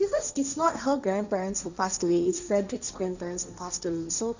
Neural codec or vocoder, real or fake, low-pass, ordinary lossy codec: codec, 16 kHz, 1.1 kbps, Voila-Tokenizer; fake; 7.2 kHz; none